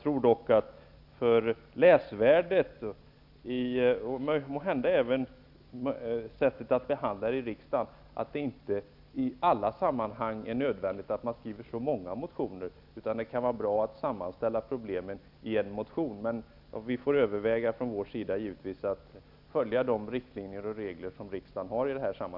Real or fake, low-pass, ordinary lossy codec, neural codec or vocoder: real; 5.4 kHz; none; none